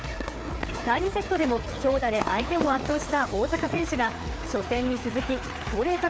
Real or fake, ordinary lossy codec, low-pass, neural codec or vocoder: fake; none; none; codec, 16 kHz, 4 kbps, FreqCodec, larger model